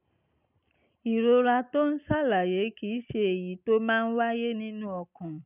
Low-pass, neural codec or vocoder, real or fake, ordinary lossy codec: 3.6 kHz; none; real; none